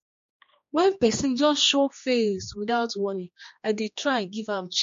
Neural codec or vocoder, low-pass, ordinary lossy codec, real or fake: codec, 16 kHz, 2 kbps, X-Codec, HuBERT features, trained on general audio; 7.2 kHz; MP3, 48 kbps; fake